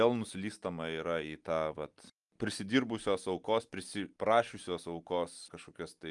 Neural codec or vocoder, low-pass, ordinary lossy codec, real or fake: none; 10.8 kHz; Opus, 32 kbps; real